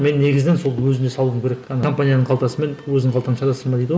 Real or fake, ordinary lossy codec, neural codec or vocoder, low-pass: real; none; none; none